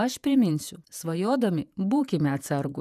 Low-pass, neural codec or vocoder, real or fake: 14.4 kHz; vocoder, 48 kHz, 128 mel bands, Vocos; fake